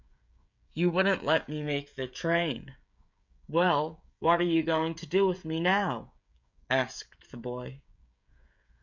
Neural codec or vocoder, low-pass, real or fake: codec, 16 kHz, 16 kbps, FreqCodec, smaller model; 7.2 kHz; fake